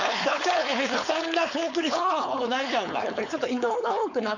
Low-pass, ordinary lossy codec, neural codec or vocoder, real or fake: 7.2 kHz; none; codec, 16 kHz, 4.8 kbps, FACodec; fake